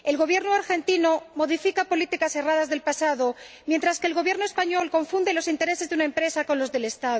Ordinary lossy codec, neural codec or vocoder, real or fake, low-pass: none; none; real; none